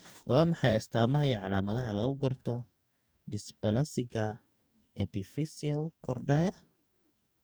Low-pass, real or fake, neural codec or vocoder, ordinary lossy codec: none; fake; codec, 44.1 kHz, 2.6 kbps, DAC; none